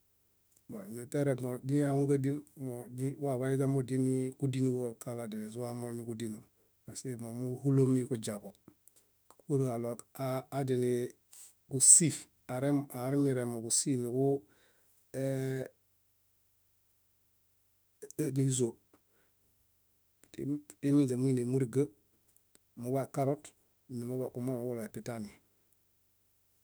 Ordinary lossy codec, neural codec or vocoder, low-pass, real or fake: none; autoencoder, 48 kHz, 32 numbers a frame, DAC-VAE, trained on Japanese speech; none; fake